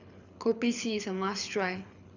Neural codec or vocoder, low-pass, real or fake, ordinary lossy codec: codec, 24 kHz, 6 kbps, HILCodec; 7.2 kHz; fake; none